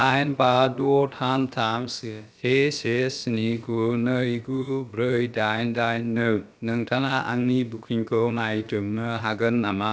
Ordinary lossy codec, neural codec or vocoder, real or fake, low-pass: none; codec, 16 kHz, about 1 kbps, DyCAST, with the encoder's durations; fake; none